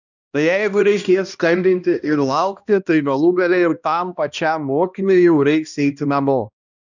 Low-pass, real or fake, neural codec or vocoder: 7.2 kHz; fake; codec, 16 kHz, 1 kbps, X-Codec, HuBERT features, trained on balanced general audio